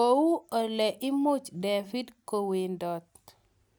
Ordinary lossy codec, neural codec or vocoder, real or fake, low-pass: none; none; real; none